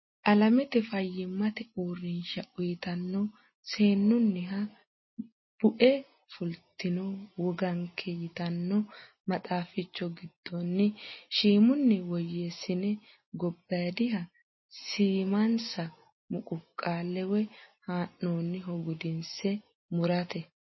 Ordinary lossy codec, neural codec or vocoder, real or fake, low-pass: MP3, 24 kbps; none; real; 7.2 kHz